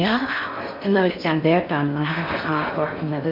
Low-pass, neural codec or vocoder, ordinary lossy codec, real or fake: 5.4 kHz; codec, 16 kHz in and 24 kHz out, 0.6 kbps, FocalCodec, streaming, 4096 codes; MP3, 48 kbps; fake